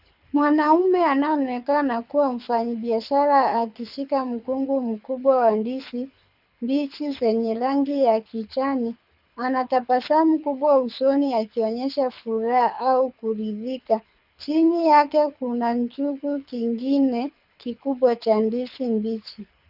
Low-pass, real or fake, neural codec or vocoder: 5.4 kHz; fake; codec, 24 kHz, 6 kbps, HILCodec